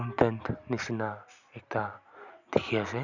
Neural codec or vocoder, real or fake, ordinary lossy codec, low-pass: none; real; none; 7.2 kHz